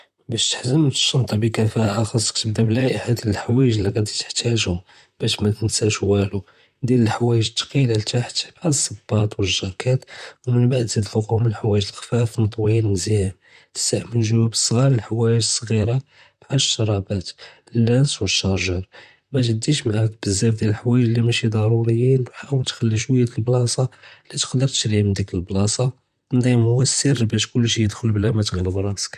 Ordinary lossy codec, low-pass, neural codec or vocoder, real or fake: none; 14.4 kHz; vocoder, 44.1 kHz, 128 mel bands, Pupu-Vocoder; fake